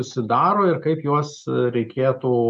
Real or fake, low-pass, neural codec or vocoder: real; 10.8 kHz; none